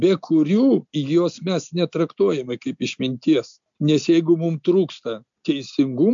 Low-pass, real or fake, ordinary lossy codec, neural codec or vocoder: 7.2 kHz; real; MP3, 64 kbps; none